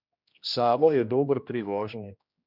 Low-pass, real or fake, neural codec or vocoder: 5.4 kHz; fake; codec, 16 kHz, 1 kbps, X-Codec, HuBERT features, trained on general audio